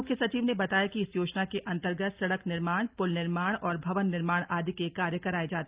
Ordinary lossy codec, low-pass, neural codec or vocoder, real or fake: Opus, 32 kbps; 3.6 kHz; none; real